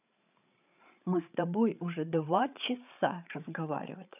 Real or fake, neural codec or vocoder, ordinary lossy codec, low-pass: fake; codec, 16 kHz, 8 kbps, FreqCodec, larger model; none; 3.6 kHz